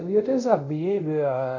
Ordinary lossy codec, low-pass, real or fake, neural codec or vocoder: none; 7.2 kHz; fake; codec, 24 kHz, 0.5 kbps, DualCodec